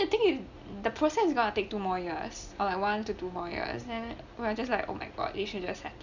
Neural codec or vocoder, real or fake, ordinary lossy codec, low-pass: none; real; none; 7.2 kHz